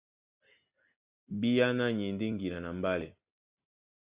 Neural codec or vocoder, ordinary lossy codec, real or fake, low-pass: none; Opus, 64 kbps; real; 3.6 kHz